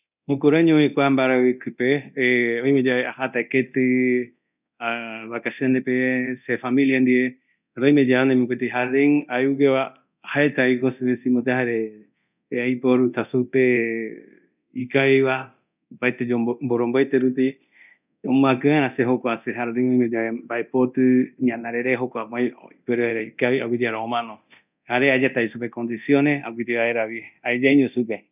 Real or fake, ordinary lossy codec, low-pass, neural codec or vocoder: fake; none; 3.6 kHz; codec, 24 kHz, 0.9 kbps, DualCodec